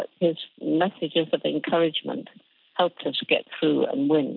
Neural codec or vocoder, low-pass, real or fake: none; 5.4 kHz; real